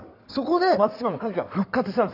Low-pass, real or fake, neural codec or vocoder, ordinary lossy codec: 5.4 kHz; fake; codec, 16 kHz in and 24 kHz out, 2.2 kbps, FireRedTTS-2 codec; none